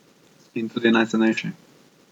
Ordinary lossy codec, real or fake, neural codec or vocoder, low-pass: none; real; none; 19.8 kHz